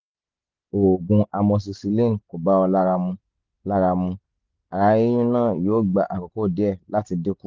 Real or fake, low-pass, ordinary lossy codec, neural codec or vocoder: real; 7.2 kHz; Opus, 32 kbps; none